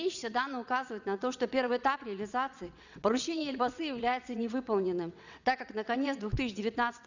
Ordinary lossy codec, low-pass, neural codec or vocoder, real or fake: none; 7.2 kHz; vocoder, 22.05 kHz, 80 mel bands, WaveNeXt; fake